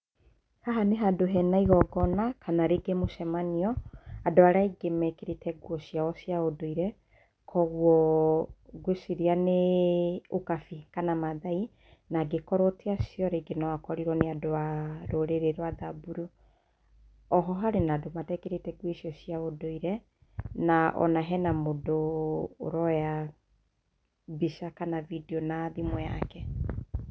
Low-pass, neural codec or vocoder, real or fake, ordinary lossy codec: none; none; real; none